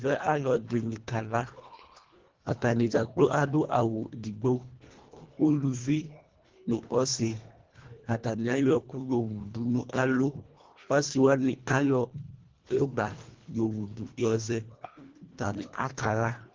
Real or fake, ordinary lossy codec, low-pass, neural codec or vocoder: fake; Opus, 16 kbps; 7.2 kHz; codec, 24 kHz, 1.5 kbps, HILCodec